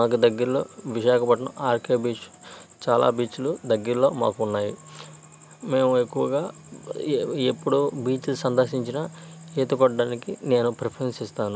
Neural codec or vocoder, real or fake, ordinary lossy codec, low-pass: none; real; none; none